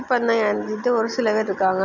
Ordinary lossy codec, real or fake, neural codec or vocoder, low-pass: none; real; none; 7.2 kHz